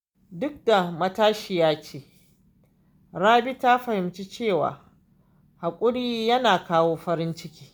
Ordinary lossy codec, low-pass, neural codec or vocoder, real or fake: none; none; none; real